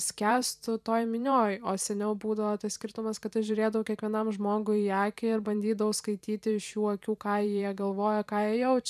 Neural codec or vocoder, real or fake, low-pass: vocoder, 44.1 kHz, 128 mel bands every 512 samples, BigVGAN v2; fake; 14.4 kHz